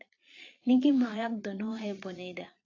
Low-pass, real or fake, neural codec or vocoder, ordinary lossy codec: 7.2 kHz; fake; vocoder, 22.05 kHz, 80 mel bands, Vocos; AAC, 32 kbps